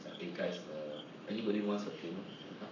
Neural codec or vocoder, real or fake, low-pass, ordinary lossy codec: codec, 44.1 kHz, 7.8 kbps, Pupu-Codec; fake; 7.2 kHz; none